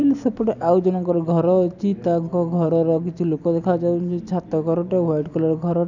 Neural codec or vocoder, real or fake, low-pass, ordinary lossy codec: none; real; 7.2 kHz; none